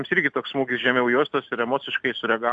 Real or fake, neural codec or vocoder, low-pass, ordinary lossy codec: real; none; 9.9 kHz; AAC, 64 kbps